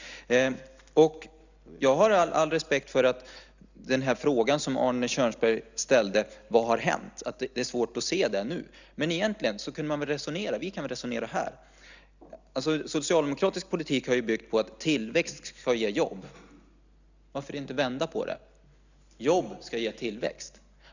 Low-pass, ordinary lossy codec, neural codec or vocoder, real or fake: 7.2 kHz; none; none; real